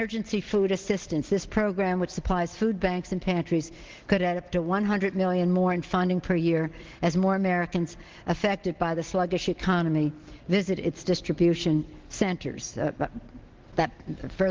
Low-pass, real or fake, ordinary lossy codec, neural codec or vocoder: 7.2 kHz; real; Opus, 16 kbps; none